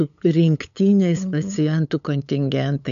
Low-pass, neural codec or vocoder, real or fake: 7.2 kHz; codec, 16 kHz, 8 kbps, FunCodec, trained on LibriTTS, 25 frames a second; fake